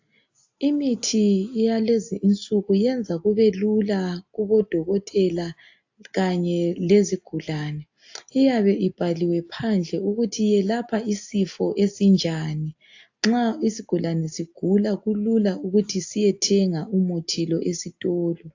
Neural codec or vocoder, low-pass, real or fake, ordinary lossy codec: none; 7.2 kHz; real; AAC, 48 kbps